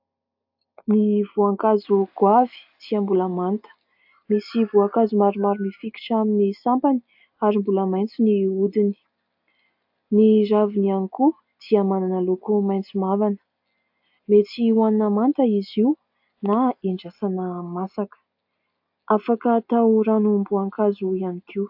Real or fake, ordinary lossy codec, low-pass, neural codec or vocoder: real; AAC, 48 kbps; 5.4 kHz; none